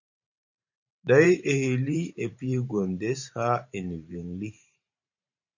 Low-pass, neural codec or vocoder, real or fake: 7.2 kHz; vocoder, 44.1 kHz, 128 mel bands every 512 samples, BigVGAN v2; fake